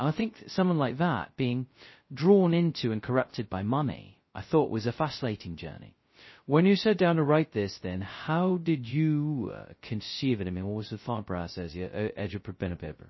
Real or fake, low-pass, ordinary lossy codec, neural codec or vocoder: fake; 7.2 kHz; MP3, 24 kbps; codec, 16 kHz, 0.2 kbps, FocalCodec